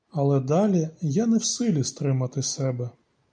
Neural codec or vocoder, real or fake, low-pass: none; real; 9.9 kHz